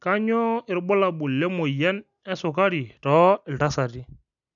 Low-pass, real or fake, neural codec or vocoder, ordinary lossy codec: 7.2 kHz; real; none; none